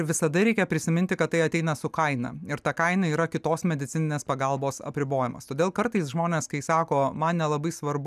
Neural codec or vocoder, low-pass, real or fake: none; 14.4 kHz; real